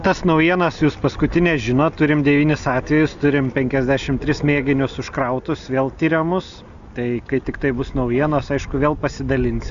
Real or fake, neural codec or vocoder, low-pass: real; none; 7.2 kHz